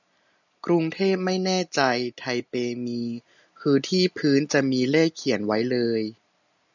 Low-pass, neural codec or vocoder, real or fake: 7.2 kHz; none; real